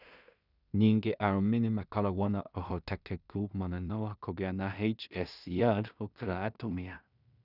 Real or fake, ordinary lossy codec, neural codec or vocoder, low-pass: fake; none; codec, 16 kHz in and 24 kHz out, 0.4 kbps, LongCat-Audio-Codec, two codebook decoder; 5.4 kHz